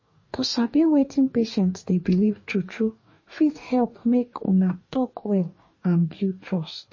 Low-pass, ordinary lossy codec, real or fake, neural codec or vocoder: 7.2 kHz; MP3, 32 kbps; fake; codec, 44.1 kHz, 2.6 kbps, DAC